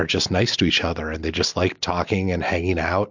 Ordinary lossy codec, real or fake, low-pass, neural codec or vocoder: MP3, 64 kbps; real; 7.2 kHz; none